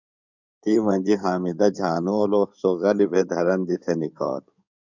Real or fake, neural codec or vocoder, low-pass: fake; codec, 16 kHz in and 24 kHz out, 2.2 kbps, FireRedTTS-2 codec; 7.2 kHz